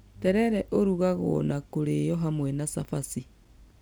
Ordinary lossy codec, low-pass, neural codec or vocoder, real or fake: none; none; none; real